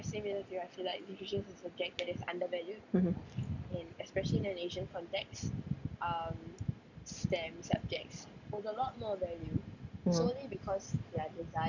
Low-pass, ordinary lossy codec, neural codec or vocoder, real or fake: 7.2 kHz; none; none; real